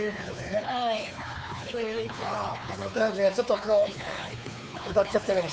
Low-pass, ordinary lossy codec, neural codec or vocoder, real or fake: none; none; codec, 16 kHz, 4 kbps, X-Codec, WavLM features, trained on Multilingual LibriSpeech; fake